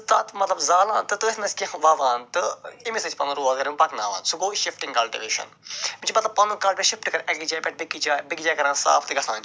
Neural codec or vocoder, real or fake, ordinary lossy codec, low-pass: none; real; none; none